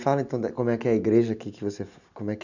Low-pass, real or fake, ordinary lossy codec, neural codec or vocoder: 7.2 kHz; real; none; none